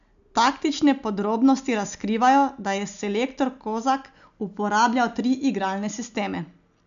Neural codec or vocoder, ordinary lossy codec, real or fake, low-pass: none; none; real; 7.2 kHz